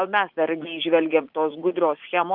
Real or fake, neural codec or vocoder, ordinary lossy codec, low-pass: fake; vocoder, 44.1 kHz, 80 mel bands, Vocos; Opus, 32 kbps; 5.4 kHz